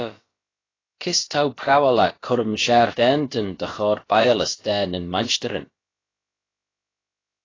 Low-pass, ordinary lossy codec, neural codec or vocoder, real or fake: 7.2 kHz; AAC, 32 kbps; codec, 16 kHz, about 1 kbps, DyCAST, with the encoder's durations; fake